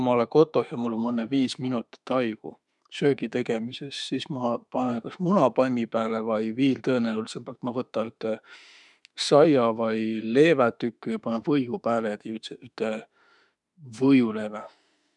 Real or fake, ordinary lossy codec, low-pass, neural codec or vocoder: fake; none; 10.8 kHz; autoencoder, 48 kHz, 32 numbers a frame, DAC-VAE, trained on Japanese speech